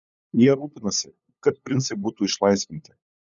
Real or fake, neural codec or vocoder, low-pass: fake; codec, 16 kHz, 8 kbps, FunCodec, trained on LibriTTS, 25 frames a second; 7.2 kHz